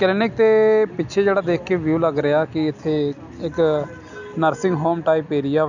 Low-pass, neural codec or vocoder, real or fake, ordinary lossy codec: 7.2 kHz; none; real; none